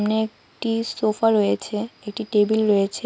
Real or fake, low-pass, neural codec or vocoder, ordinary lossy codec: real; none; none; none